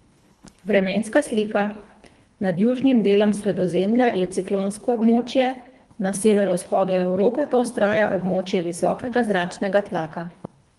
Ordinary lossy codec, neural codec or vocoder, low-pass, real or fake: Opus, 32 kbps; codec, 24 kHz, 1.5 kbps, HILCodec; 10.8 kHz; fake